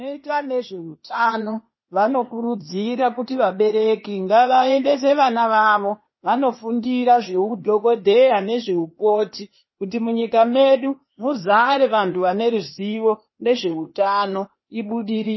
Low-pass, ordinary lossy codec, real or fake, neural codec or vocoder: 7.2 kHz; MP3, 24 kbps; fake; codec, 16 kHz, 0.8 kbps, ZipCodec